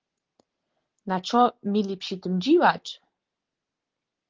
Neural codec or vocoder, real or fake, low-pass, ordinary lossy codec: none; real; 7.2 kHz; Opus, 16 kbps